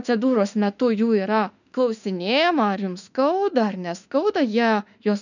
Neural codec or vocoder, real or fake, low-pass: autoencoder, 48 kHz, 32 numbers a frame, DAC-VAE, trained on Japanese speech; fake; 7.2 kHz